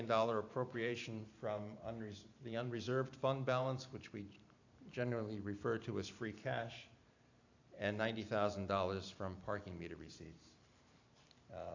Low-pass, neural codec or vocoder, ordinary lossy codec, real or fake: 7.2 kHz; vocoder, 44.1 kHz, 128 mel bands every 512 samples, BigVGAN v2; AAC, 48 kbps; fake